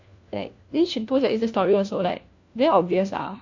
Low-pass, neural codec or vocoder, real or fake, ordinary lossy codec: 7.2 kHz; codec, 16 kHz, 1 kbps, FunCodec, trained on LibriTTS, 50 frames a second; fake; AAC, 48 kbps